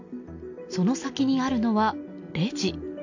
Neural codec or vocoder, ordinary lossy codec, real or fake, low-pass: none; AAC, 48 kbps; real; 7.2 kHz